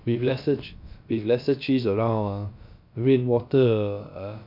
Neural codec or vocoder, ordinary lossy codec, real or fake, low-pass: codec, 16 kHz, about 1 kbps, DyCAST, with the encoder's durations; MP3, 48 kbps; fake; 5.4 kHz